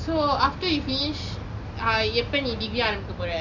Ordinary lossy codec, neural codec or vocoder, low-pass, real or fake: none; none; 7.2 kHz; real